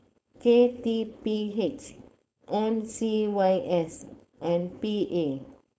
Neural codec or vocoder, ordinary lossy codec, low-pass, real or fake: codec, 16 kHz, 4.8 kbps, FACodec; none; none; fake